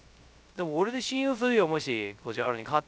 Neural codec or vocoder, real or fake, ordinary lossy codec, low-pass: codec, 16 kHz, 0.3 kbps, FocalCodec; fake; none; none